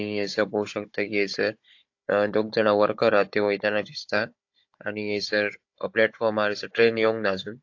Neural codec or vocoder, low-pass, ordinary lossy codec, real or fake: codec, 16 kHz, 16 kbps, FunCodec, trained on Chinese and English, 50 frames a second; 7.2 kHz; AAC, 48 kbps; fake